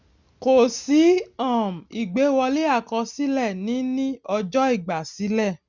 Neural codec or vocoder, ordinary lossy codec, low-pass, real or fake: none; none; 7.2 kHz; real